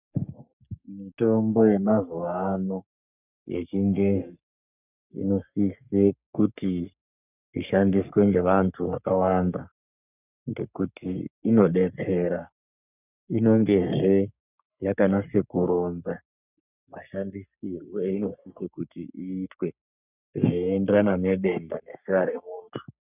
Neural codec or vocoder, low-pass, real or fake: codec, 44.1 kHz, 3.4 kbps, Pupu-Codec; 3.6 kHz; fake